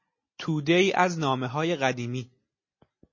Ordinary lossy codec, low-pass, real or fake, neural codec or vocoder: MP3, 32 kbps; 7.2 kHz; real; none